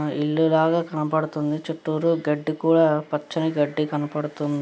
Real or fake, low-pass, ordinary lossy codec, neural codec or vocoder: real; none; none; none